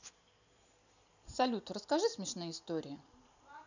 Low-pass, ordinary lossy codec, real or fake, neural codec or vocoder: 7.2 kHz; none; real; none